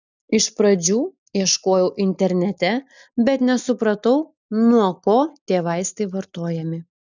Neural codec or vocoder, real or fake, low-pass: none; real; 7.2 kHz